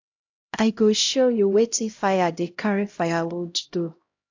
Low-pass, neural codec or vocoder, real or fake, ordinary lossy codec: 7.2 kHz; codec, 16 kHz, 0.5 kbps, X-Codec, HuBERT features, trained on LibriSpeech; fake; AAC, 48 kbps